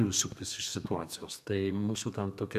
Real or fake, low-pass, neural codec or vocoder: fake; 14.4 kHz; codec, 32 kHz, 1.9 kbps, SNAC